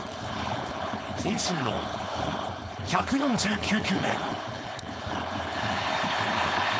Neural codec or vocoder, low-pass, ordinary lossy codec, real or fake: codec, 16 kHz, 4.8 kbps, FACodec; none; none; fake